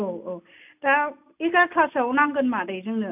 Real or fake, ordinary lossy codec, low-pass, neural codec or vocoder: real; AAC, 32 kbps; 3.6 kHz; none